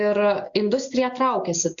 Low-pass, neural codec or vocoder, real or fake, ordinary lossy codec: 7.2 kHz; none; real; AAC, 64 kbps